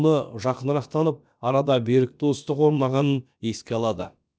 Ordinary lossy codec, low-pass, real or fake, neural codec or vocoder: none; none; fake; codec, 16 kHz, about 1 kbps, DyCAST, with the encoder's durations